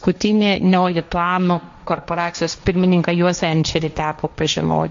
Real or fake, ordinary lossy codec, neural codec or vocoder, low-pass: fake; MP3, 48 kbps; codec, 16 kHz, 1.1 kbps, Voila-Tokenizer; 7.2 kHz